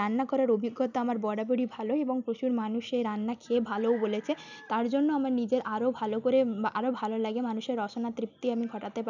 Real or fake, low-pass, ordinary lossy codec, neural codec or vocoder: real; 7.2 kHz; none; none